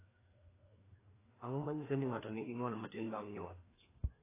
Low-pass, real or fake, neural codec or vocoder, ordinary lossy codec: 3.6 kHz; fake; codec, 16 kHz, 2 kbps, FreqCodec, larger model; AAC, 16 kbps